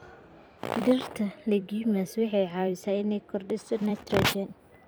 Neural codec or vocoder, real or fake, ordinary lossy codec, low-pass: vocoder, 44.1 kHz, 128 mel bands every 256 samples, BigVGAN v2; fake; none; none